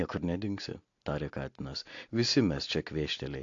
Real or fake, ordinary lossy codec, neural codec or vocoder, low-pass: real; AAC, 48 kbps; none; 7.2 kHz